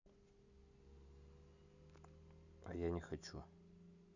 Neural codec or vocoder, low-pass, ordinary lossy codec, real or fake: none; 7.2 kHz; none; real